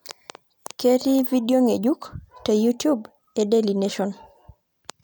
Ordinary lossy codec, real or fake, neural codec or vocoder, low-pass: none; real; none; none